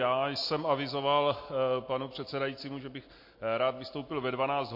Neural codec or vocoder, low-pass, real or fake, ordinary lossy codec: none; 5.4 kHz; real; MP3, 32 kbps